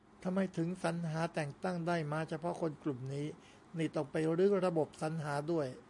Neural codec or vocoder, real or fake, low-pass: none; real; 10.8 kHz